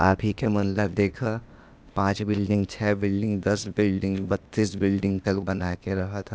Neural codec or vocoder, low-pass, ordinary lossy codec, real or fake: codec, 16 kHz, 0.8 kbps, ZipCodec; none; none; fake